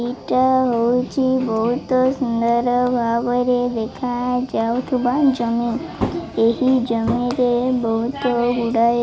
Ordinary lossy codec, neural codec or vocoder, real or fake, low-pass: none; none; real; none